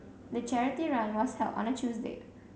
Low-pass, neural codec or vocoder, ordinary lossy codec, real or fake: none; none; none; real